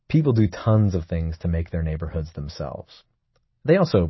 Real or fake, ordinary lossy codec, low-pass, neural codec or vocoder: real; MP3, 24 kbps; 7.2 kHz; none